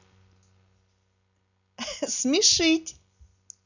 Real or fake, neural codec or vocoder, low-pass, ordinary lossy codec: real; none; 7.2 kHz; none